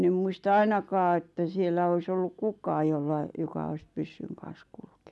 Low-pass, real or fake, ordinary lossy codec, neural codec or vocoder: none; real; none; none